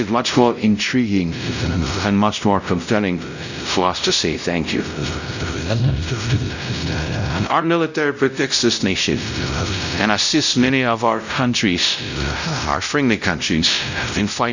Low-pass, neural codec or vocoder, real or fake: 7.2 kHz; codec, 16 kHz, 0.5 kbps, X-Codec, WavLM features, trained on Multilingual LibriSpeech; fake